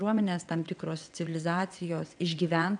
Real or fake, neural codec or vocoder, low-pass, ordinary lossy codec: fake; vocoder, 22.05 kHz, 80 mel bands, WaveNeXt; 9.9 kHz; MP3, 96 kbps